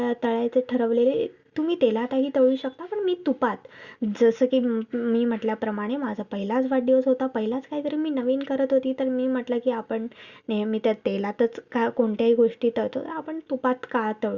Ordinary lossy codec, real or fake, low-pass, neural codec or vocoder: Opus, 64 kbps; real; 7.2 kHz; none